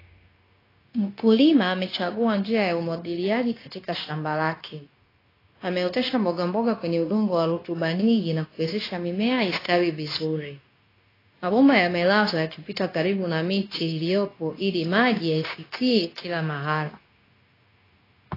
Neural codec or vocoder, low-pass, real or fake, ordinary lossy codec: codec, 16 kHz, 0.9 kbps, LongCat-Audio-Codec; 5.4 kHz; fake; AAC, 24 kbps